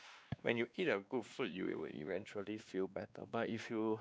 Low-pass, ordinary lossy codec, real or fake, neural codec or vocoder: none; none; fake; codec, 16 kHz, 2 kbps, X-Codec, WavLM features, trained on Multilingual LibriSpeech